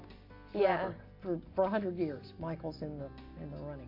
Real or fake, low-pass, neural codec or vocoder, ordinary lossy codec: real; 5.4 kHz; none; MP3, 32 kbps